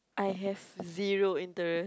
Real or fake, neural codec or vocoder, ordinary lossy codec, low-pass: real; none; none; none